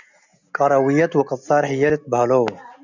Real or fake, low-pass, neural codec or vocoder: real; 7.2 kHz; none